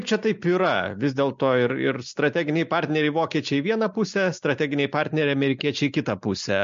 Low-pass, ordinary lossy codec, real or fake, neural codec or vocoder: 7.2 kHz; MP3, 48 kbps; real; none